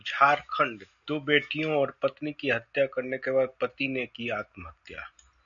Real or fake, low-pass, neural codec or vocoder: real; 7.2 kHz; none